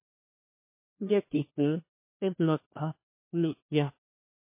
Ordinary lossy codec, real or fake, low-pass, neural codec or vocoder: MP3, 24 kbps; fake; 3.6 kHz; codec, 16 kHz, 1 kbps, FunCodec, trained on LibriTTS, 50 frames a second